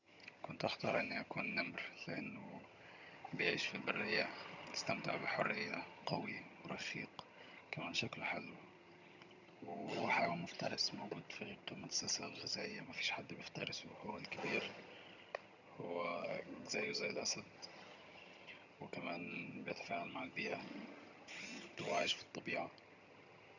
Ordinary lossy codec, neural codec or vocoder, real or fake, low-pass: none; vocoder, 22.05 kHz, 80 mel bands, HiFi-GAN; fake; 7.2 kHz